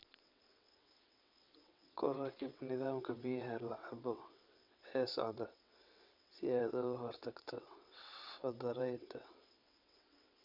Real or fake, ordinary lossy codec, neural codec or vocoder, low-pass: fake; none; vocoder, 22.05 kHz, 80 mel bands, WaveNeXt; 5.4 kHz